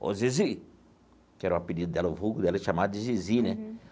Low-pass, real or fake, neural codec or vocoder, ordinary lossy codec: none; real; none; none